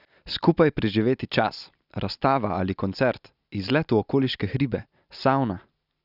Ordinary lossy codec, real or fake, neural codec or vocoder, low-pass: none; real; none; 5.4 kHz